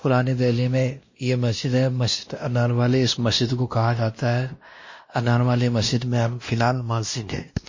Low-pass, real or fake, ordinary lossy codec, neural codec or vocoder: 7.2 kHz; fake; MP3, 32 kbps; codec, 16 kHz, 1 kbps, X-Codec, WavLM features, trained on Multilingual LibriSpeech